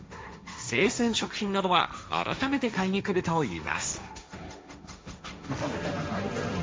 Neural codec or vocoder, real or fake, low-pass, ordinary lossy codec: codec, 16 kHz, 1.1 kbps, Voila-Tokenizer; fake; none; none